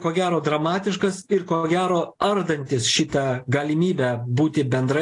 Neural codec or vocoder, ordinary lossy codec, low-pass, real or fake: none; AAC, 48 kbps; 10.8 kHz; real